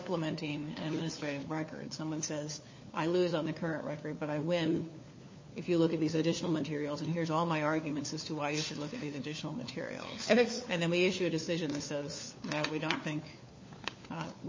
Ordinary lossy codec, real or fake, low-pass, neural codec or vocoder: MP3, 32 kbps; fake; 7.2 kHz; codec, 16 kHz, 4 kbps, FunCodec, trained on LibriTTS, 50 frames a second